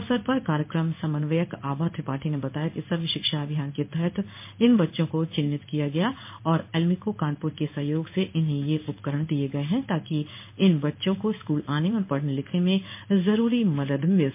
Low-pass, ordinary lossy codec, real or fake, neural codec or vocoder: 3.6 kHz; MP3, 24 kbps; fake; codec, 16 kHz in and 24 kHz out, 1 kbps, XY-Tokenizer